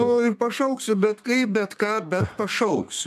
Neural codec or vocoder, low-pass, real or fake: codec, 32 kHz, 1.9 kbps, SNAC; 14.4 kHz; fake